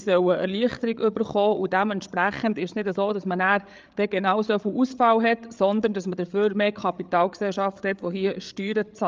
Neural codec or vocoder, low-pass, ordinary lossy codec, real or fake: codec, 16 kHz, 8 kbps, FreqCodec, larger model; 7.2 kHz; Opus, 24 kbps; fake